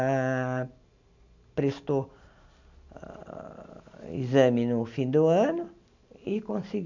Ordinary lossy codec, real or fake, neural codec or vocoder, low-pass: none; real; none; 7.2 kHz